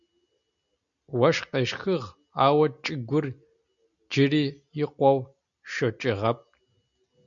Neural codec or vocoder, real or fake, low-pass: none; real; 7.2 kHz